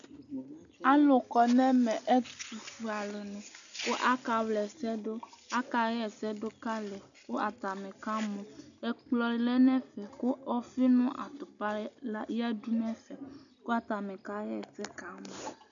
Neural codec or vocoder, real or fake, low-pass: none; real; 7.2 kHz